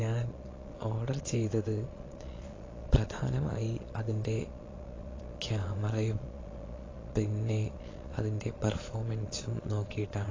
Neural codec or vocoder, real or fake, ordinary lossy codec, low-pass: vocoder, 22.05 kHz, 80 mel bands, Vocos; fake; AAC, 32 kbps; 7.2 kHz